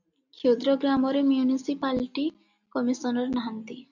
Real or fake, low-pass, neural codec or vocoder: real; 7.2 kHz; none